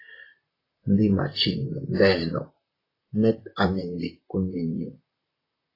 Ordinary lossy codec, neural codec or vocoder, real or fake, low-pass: AAC, 24 kbps; vocoder, 44.1 kHz, 128 mel bands, Pupu-Vocoder; fake; 5.4 kHz